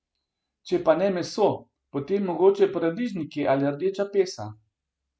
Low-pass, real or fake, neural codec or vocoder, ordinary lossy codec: none; real; none; none